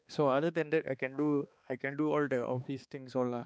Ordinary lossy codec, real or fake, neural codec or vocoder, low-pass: none; fake; codec, 16 kHz, 2 kbps, X-Codec, HuBERT features, trained on balanced general audio; none